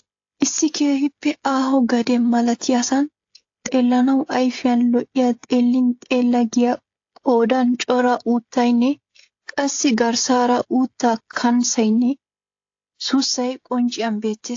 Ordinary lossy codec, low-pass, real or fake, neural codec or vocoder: AAC, 48 kbps; 7.2 kHz; fake; codec, 16 kHz, 16 kbps, FreqCodec, smaller model